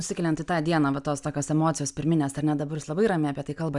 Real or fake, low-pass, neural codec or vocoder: real; 10.8 kHz; none